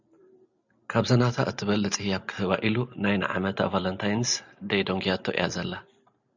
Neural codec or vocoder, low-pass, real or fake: none; 7.2 kHz; real